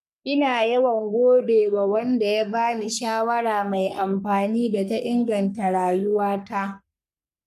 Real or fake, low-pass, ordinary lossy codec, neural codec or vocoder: fake; 14.4 kHz; none; codec, 44.1 kHz, 3.4 kbps, Pupu-Codec